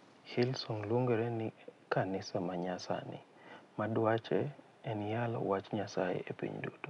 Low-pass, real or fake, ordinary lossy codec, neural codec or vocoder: 10.8 kHz; real; none; none